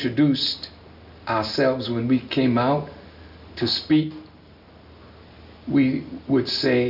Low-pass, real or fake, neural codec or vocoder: 5.4 kHz; real; none